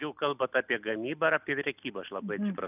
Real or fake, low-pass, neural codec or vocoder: real; 3.6 kHz; none